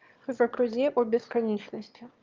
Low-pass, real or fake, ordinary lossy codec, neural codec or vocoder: 7.2 kHz; fake; Opus, 32 kbps; autoencoder, 22.05 kHz, a latent of 192 numbers a frame, VITS, trained on one speaker